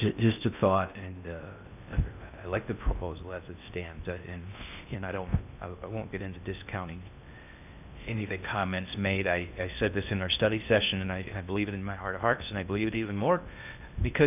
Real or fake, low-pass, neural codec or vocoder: fake; 3.6 kHz; codec, 16 kHz in and 24 kHz out, 0.6 kbps, FocalCodec, streaming, 4096 codes